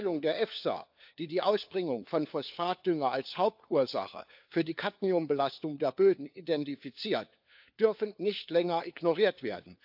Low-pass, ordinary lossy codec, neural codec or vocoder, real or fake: 5.4 kHz; AAC, 48 kbps; codec, 16 kHz, 4 kbps, FunCodec, trained on LibriTTS, 50 frames a second; fake